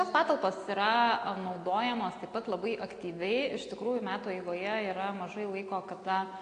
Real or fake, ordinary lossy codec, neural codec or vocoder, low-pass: real; AAC, 48 kbps; none; 9.9 kHz